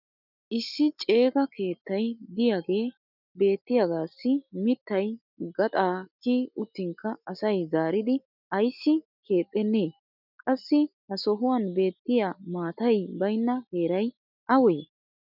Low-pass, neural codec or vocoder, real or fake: 5.4 kHz; none; real